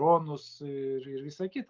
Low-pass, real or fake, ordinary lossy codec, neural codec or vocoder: 7.2 kHz; real; Opus, 32 kbps; none